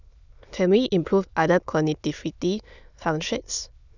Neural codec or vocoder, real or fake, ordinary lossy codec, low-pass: autoencoder, 22.05 kHz, a latent of 192 numbers a frame, VITS, trained on many speakers; fake; none; 7.2 kHz